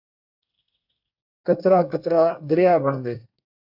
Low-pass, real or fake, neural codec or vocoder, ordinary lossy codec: 5.4 kHz; fake; codec, 24 kHz, 1 kbps, SNAC; AAC, 48 kbps